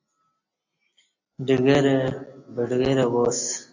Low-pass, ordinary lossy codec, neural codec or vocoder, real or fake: 7.2 kHz; AAC, 48 kbps; none; real